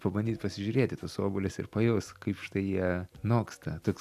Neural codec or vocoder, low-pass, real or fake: none; 14.4 kHz; real